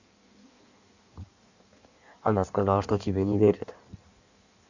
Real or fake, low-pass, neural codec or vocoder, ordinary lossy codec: fake; 7.2 kHz; codec, 16 kHz in and 24 kHz out, 1.1 kbps, FireRedTTS-2 codec; none